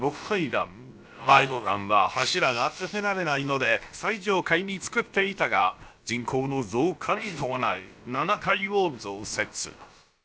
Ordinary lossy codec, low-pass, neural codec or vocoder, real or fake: none; none; codec, 16 kHz, about 1 kbps, DyCAST, with the encoder's durations; fake